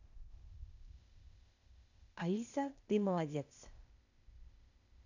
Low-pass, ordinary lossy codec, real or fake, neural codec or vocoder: 7.2 kHz; none; fake; codec, 16 kHz, 0.8 kbps, ZipCodec